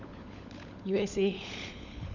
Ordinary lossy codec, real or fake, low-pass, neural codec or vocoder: none; fake; 7.2 kHz; codec, 16 kHz, 16 kbps, FunCodec, trained on LibriTTS, 50 frames a second